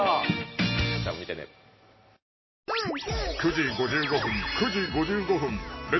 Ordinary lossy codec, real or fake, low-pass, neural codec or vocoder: MP3, 24 kbps; real; 7.2 kHz; none